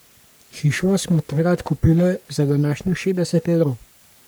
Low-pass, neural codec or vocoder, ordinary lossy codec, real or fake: none; codec, 44.1 kHz, 3.4 kbps, Pupu-Codec; none; fake